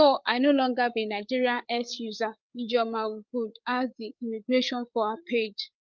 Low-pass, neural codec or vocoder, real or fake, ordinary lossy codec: 7.2 kHz; codec, 16 kHz, 8 kbps, FreqCodec, larger model; fake; Opus, 32 kbps